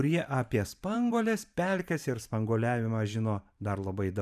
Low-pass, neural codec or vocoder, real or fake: 14.4 kHz; vocoder, 44.1 kHz, 128 mel bands every 512 samples, BigVGAN v2; fake